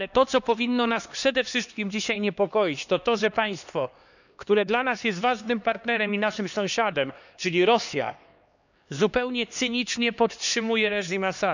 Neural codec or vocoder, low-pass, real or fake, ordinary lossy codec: codec, 16 kHz, 2 kbps, X-Codec, HuBERT features, trained on LibriSpeech; 7.2 kHz; fake; none